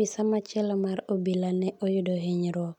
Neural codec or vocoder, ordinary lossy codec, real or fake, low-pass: none; none; real; 19.8 kHz